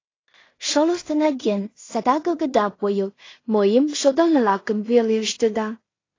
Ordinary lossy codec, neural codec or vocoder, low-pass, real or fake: AAC, 32 kbps; codec, 16 kHz in and 24 kHz out, 0.4 kbps, LongCat-Audio-Codec, two codebook decoder; 7.2 kHz; fake